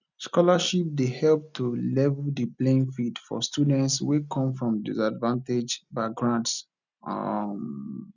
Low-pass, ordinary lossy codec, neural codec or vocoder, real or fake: 7.2 kHz; none; none; real